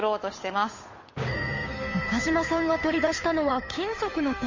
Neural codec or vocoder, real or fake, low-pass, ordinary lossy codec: codec, 16 kHz, 8 kbps, FunCodec, trained on Chinese and English, 25 frames a second; fake; 7.2 kHz; MP3, 32 kbps